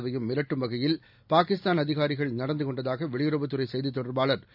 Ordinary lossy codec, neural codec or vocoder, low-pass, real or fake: none; none; 5.4 kHz; real